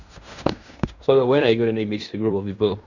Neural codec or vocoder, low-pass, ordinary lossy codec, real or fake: codec, 16 kHz in and 24 kHz out, 0.9 kbps, LongCat-Audio-Codec, fine tuned four codebook decoder; 7.2 kHz; none; fake